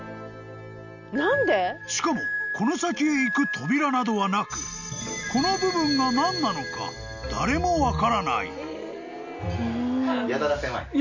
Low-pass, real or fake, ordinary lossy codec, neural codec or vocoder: 7.2 kHz; real; none; none